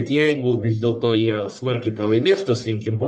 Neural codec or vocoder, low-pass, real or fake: codec, 44.1 kHz, 1.7 kbps, Pupu-Codec; 10.8 kHz; fake